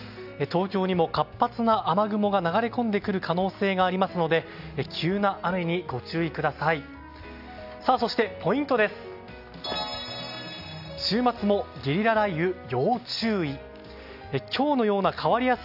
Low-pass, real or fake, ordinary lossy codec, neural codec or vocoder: 5.4 kHz; real; AAC, 48 kbps; none